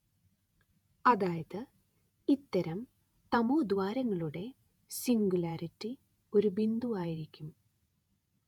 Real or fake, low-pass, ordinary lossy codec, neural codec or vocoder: fake; 19.8 kHz; none; vocoder, 48 kHz, 128 mel bands, Vocos